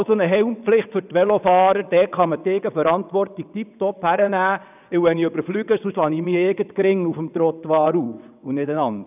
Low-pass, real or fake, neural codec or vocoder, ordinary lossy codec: 3.6 kHz; fake; vocoder, 22.05 kHz, 80 mel bands, WaveNeXt; none